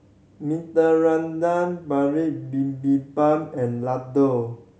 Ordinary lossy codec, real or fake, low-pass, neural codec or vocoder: none; real; none; none